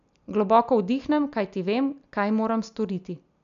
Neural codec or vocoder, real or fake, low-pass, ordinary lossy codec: none; real; 7.2 kHz; none